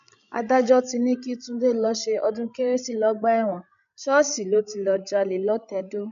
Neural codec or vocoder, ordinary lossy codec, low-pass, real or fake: codec, 16 kHz, 16 kbps, FreqCodec, larger model; none; 7.2 kHz; fake